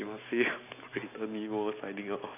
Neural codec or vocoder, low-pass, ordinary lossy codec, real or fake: none; 3.6 kHz; none; real